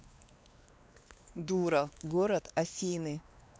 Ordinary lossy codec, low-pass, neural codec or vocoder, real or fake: none; none; codec, 16 kHz, 2 kbps, X-Codec, WavLM features, trained on Multilingual LibriSpeech; fake